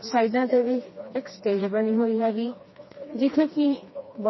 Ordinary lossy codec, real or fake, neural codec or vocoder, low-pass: MP3, 24 kbps; fake; codec, 16 kHz, 2 kbps, FreqCodec, smaller model; 7.2 kHz